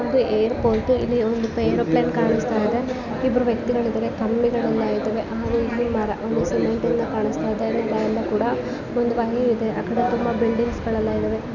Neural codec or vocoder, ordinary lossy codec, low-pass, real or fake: none; none; 7.2 kHz; real